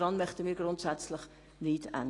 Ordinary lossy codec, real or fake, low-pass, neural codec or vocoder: AAC, 32 kbps; real; 10.8 kHz; none